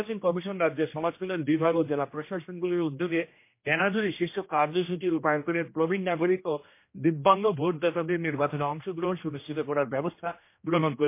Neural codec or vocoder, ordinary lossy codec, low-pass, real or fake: codec, 16 kHz, 1 kbps, X-Codec, HuBERT features, trained on general audio; MP3, 24 kbps; 3.6 kHz; fake